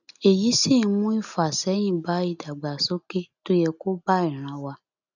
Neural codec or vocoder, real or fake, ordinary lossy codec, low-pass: none; real; none; 7.2 kHz